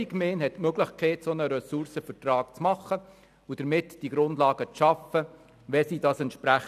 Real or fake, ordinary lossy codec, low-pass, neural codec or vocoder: real; none; 14.4 kHz; none